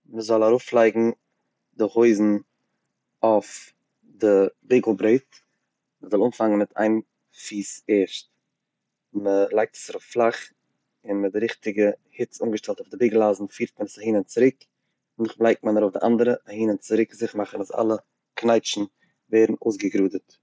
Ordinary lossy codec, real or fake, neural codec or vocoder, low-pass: none; real; none; none